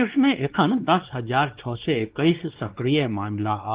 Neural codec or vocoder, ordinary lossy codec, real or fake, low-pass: codec, 16 kHz, 2 kbps, X-Codec, WavLM features, trained on Multilingual LibriSpeech; Opus, 16 kbps; fake; 3.6 kHz